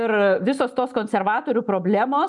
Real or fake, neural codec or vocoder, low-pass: real; none; 10.8 kHz